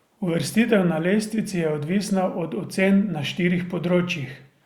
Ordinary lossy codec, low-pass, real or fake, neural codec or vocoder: Opus, 64 kbps; 19.8 kHz; real; none